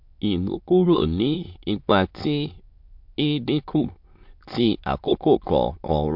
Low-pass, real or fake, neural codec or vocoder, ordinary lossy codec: 5.4 kHz; fake; autoencoder, 22.05 kHz, a latent of 192 numbers a frame, VITS, trained on many speakers; MP3, 48 kbps